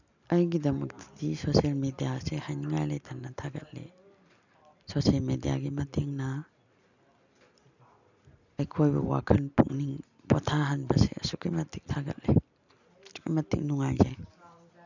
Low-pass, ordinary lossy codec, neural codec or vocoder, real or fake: 7.2 kHz; none; none; real